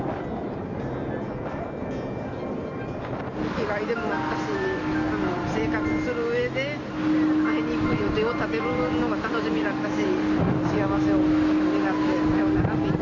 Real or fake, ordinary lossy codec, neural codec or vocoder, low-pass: real; none; none; 7.2 kHz